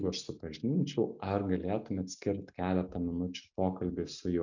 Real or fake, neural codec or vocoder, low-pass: real; none; 7.2 kHz